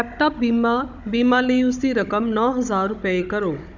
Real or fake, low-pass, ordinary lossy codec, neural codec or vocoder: fake; 7.2 kHz; none; codec, 16 kHz, 16 kbps, FunCodec, trained on Chinese and English, 50 frames a second